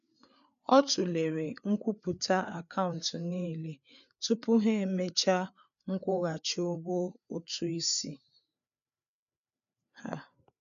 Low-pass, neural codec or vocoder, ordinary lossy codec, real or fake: 7.2 kHz; codec, 16 kHz, 4 kbps, FreqCodec, larger model; none; fake